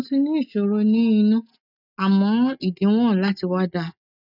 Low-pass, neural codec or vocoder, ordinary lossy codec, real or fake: 5.4 kHz; none; none; real